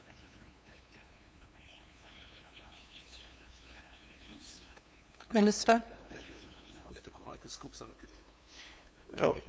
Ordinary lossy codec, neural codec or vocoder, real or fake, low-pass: none; codec, 16 kHz, 2 kbps, FunCodec, trained on LibriTTS, 25 frames a second; fake; none